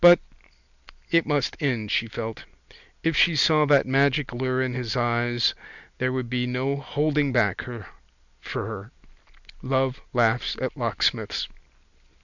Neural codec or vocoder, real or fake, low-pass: none; real; 7.2 kHz